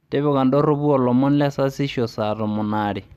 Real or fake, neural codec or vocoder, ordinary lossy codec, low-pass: real; none; none; 14.4 kHz